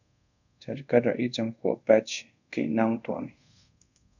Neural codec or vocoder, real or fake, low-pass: codec, 24 kHz, 0.5 kbps, DualCodec; fake; 7.2 kHz